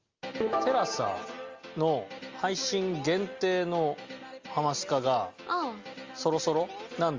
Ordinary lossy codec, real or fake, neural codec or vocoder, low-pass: Opus, 32 kbps; real; none; 7.2 kHz